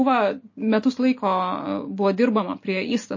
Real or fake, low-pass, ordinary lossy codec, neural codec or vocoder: real; 7.2 kHz; MP3, 32 kbps; none